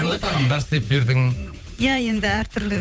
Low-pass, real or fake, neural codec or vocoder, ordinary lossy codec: none; fake; codec, 16 kHz, 8 kbps, FunCodec, trained on Chinese and English, 25 frames a second; none